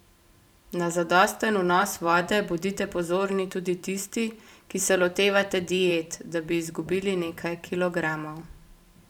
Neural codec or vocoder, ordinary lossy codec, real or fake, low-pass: vocoder, 44.1 kHz, 128 mel bands every 512 samples, BigVGAN v2; none; fake; 19.8 kHz